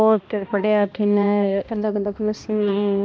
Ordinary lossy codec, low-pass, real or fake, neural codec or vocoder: none; none; fake; codec, 16 kHz, 1 kbps, X-Codec, HuBERT features, trained on balanced general audio